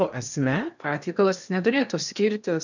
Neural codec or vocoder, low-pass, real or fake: codec, 16 kHz in and 24 kHz out, 0.8 kbps, FocalCodec, streaming, 65536 codes; 7.2 kHz; fake